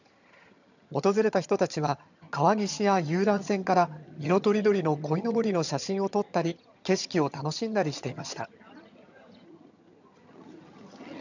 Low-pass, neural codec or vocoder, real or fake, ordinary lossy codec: 7.2 kHz; vocoder, 22.05 kHz, 80 mel bands, HiFi-GAN; fake; none